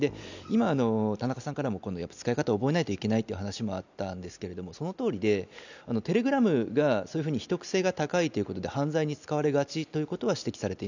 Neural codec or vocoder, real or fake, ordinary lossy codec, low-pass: none; real; none; 7.2 kHz